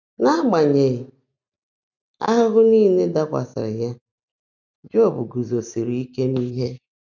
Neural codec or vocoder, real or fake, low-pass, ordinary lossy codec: none; real; 7.2 kHz; none